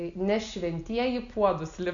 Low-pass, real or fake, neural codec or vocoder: 7.2 kHz; real; none